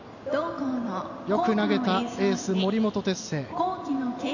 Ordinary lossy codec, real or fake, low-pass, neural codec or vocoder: none; real; 7.2 kHz; none